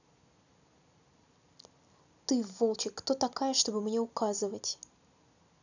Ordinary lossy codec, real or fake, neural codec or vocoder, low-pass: none; real; none; 7.2 kHz